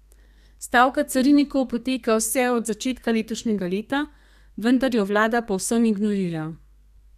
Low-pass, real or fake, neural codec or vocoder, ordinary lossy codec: 14.4 kHz; fake; codec, 32 kHz, 1.9 kbps, SNAC; none